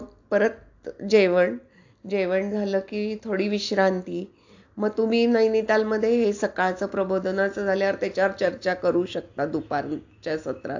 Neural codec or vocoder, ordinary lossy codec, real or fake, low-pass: none; AAC, 48 kbps; real; 7.2 kHz